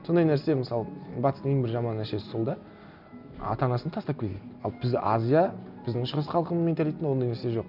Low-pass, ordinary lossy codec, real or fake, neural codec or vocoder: 5.4 kHz; none; real; none